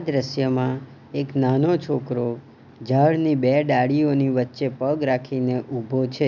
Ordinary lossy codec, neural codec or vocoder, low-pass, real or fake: none; none; 7.2 kHz; real